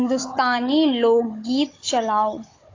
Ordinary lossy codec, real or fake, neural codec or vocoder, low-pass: AAC, 32 kbps; fake; codec, 16 kHz, 16 kbps, FunCodec, trained on Chinese and English, 50 frames a second; 7.2 kHz